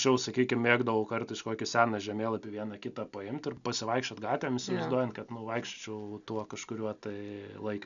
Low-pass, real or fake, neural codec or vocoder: 7.2 kHz; real; none